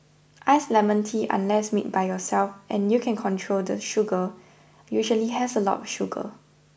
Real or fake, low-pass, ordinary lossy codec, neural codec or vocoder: real; none; none; none